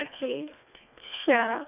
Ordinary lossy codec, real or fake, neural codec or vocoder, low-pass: none; fake; codec, 24 kHz, 1.5 kbps, HILCodec; 3.6 kHz